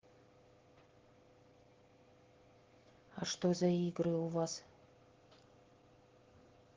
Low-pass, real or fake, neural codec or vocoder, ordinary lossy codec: 7.2 kHz; real; none; Opus, 16 kbps